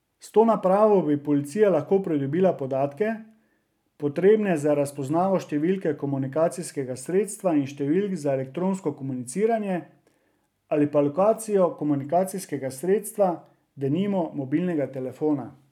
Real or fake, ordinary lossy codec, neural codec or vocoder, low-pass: real; none; none; 19.8 kHz